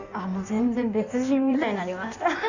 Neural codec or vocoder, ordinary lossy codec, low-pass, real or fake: codec, 16 kHz in and 24 kHz out, 1.1 kbps, FireRedTTS-2 codec; none; 7.2 kHz; fake